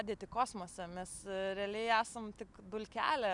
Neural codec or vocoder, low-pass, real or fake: none; 10.8 kHz; real